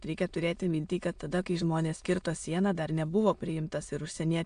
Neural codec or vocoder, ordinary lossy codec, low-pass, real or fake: autoencoder, 22.05 kHz, a latent of 192 numbers a frame, VITS, trained on many speakers; AAC, 64 kbps; 9.9 kHz; fake